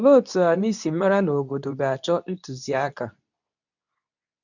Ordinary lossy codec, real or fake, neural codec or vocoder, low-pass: none; fake; codec, 24 kHz, 0.9 kbps, WavTokenizer, medium speech release version 2; 7.2 kHz